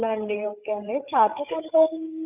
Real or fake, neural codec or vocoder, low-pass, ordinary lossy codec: fake; codec, 16 kHz, 8 kbps, FreqCodec, larger model; 3.6 kHz; none